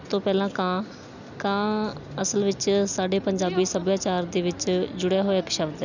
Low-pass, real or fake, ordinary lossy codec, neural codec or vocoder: 7.2 kHz; real; none; none